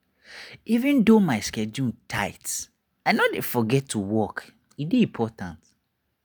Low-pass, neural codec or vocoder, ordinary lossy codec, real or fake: none; none; none; real